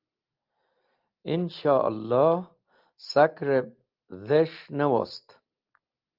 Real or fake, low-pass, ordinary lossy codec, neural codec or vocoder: real; 5.4 kHz; Opus, 32 kbps; none